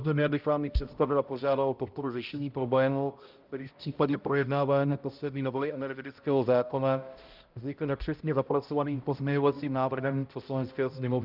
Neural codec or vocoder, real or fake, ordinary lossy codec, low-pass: codec, 16 kHz, 0.5 kbps, X-Codec, HuBERT features, trained on balanced general audio; fake; Opus, 24 kbps; 5.4 kHz